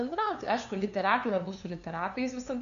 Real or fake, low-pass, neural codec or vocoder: fake; 7.2 kHz; codec, 16 kHz, 2 kbps, FunCodec, trained on LibriTTS, 25 frames a second